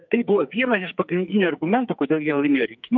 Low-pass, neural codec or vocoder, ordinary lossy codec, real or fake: 7.2 kHz; codec, 44.1 kHz, 2.6 kbps, SNAC; MP3, 48 kbps; fake